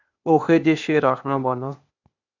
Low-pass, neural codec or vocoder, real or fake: 7.2 kHz; codec, 16 kHz, 0.8 kbps, ZipCodec; fake